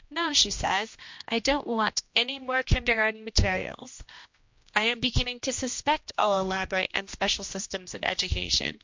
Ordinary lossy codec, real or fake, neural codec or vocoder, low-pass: MP3, 48 kbps; fake; codec, 16 kHz, 1 kbps, X-Codec, HuBERT features, trained on general audio; 7.2 kHz